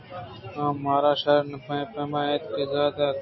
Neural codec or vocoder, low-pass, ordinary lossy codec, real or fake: none; 7.2 kHz; MP3, 24 kbps; real